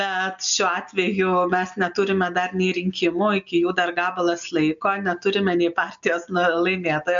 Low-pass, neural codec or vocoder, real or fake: 7.2 kHz; none; real